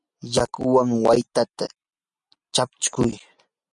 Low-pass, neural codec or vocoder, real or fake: 10.8 kHz; none; real